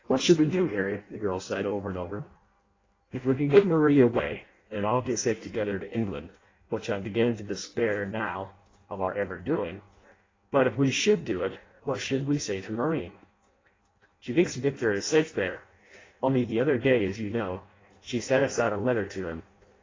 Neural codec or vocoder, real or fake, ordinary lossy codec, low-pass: codec, 16 kHz in and 24 kHz out, 0.6 kbps, FireRedTTS-2 codec; fake; AAC, 32 kbps; 7.2 kHz